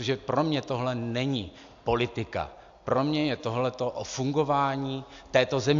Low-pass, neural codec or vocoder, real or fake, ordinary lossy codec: 7.2 kHz; none; real; AAC, 64 kbps